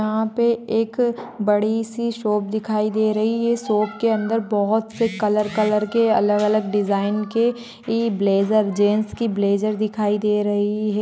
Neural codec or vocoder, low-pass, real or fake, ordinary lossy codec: none; none; real; none